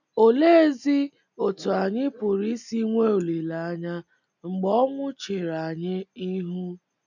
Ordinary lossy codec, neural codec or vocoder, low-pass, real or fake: none; none; 7.2 kHz; real